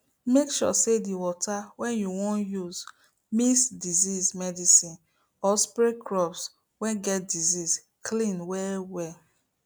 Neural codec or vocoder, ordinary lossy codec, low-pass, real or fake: none; none; none; real